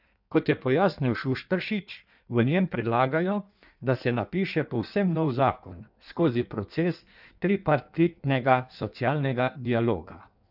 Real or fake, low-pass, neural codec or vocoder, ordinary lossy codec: fake; 5.4 kHz; codec, 16 kHz in and 24 kHz out, 1.1 kbps, FireRedTTS-2 codec; none